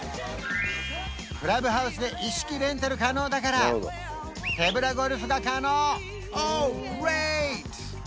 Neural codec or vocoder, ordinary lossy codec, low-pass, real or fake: none; none; none; real